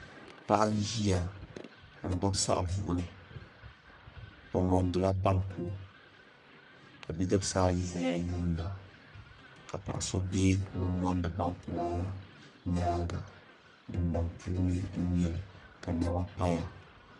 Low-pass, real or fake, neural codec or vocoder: 10.8 kHz; fake; codec, 44.1 kHz, 1.7 kbps, Pupu-Codec